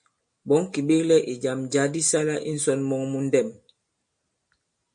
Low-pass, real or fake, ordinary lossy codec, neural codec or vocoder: 9.9 kHz; real; MP3, 48 kbps; none